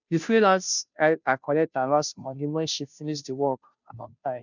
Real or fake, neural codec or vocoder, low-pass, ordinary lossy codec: fake; codec, 16 kHz, 0.5 kbps, FunCodec, trained on Chinese and English, 25 frames a second; 7.2 kHz; none